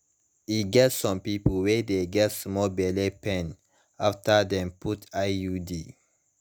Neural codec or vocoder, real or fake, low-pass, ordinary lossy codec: none; real; none; none